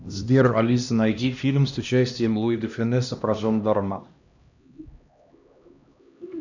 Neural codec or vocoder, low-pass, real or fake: codec, 16 kHz, 1 kbps, X-Codec, HuBERT features, trained on LibriSpeech; 7.2 kHz; fake